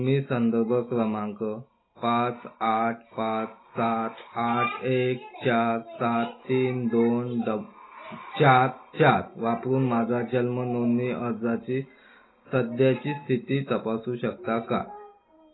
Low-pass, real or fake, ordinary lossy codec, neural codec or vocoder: 7.2 kHz; real; AAC, 16 kbps; none